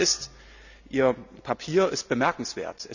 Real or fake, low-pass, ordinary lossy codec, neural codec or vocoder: real; 7.2 kHz; none; none